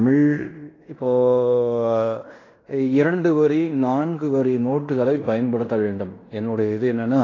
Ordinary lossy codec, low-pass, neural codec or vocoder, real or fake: AAC, 32 kbps; 7.2 kHz; codec, 16 kHz in and 24 kHz out, 0.9 kbps, LongCat-Audio-Codec, fine tuned four codebook decoder; fake